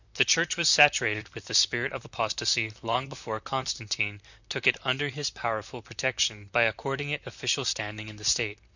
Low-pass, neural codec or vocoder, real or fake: 7.2 kHz; vocoder, 44.1 kHz, 128 mel bands, Pupu-Vocoder; fake